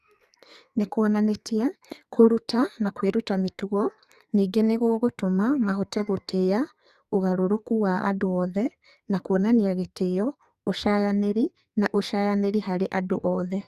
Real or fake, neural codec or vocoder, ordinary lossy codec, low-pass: fake; codec, 44.1 kHz, 2.6 kbps, SNAC; Opus, 64 kbps; 14.4 kHz